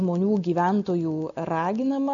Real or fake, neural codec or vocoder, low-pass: real; none; 7.2 kHz